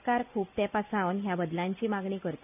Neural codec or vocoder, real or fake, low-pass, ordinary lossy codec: none; real; 3.6 kHz; none